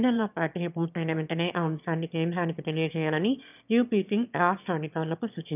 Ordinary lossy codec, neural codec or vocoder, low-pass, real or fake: none; autoencoder, 22.05 kHz, a latent of 192 numbers a frame, VITS, trained on one speaker; 3.6 kHz; fake